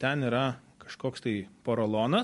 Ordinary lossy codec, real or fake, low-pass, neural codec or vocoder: MP3, 48 kbps; real; 14.4 kHz; none